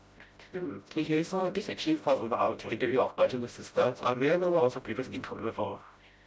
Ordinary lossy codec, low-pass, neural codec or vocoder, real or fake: none; none; codec, 16 kHz, 0.5 kbps, FreqCodec, smaller model; fake